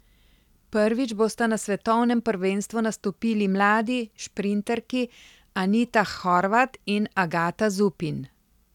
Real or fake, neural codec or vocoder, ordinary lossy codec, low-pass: real; none; none; 19.8 kHz